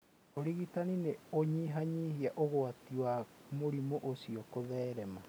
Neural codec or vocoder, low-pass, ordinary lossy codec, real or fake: none; none; none; real